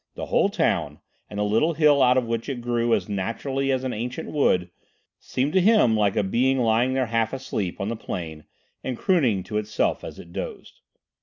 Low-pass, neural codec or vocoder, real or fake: 7.2 kHz; none; real